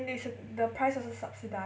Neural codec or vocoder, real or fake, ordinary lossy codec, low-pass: none; real; none; none